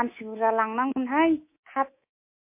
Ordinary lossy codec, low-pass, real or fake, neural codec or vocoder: MP3, 24 kbps; 3.6 kHz; fake; codec, 24 kHz, 3.1 kbps, DualCodec